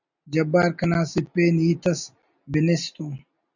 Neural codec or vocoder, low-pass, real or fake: none; 7.2 kHz; real